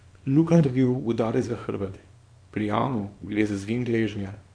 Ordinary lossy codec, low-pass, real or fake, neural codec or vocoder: MP3, 64 kbps; 9.9 kHz; fake; codec, 24 kHz, 0.9 kbps, WavTokenizer, small release